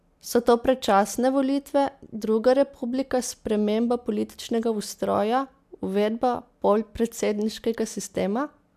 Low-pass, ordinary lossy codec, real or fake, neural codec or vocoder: 14.4 kHz; MP3, 96 kbps; real; none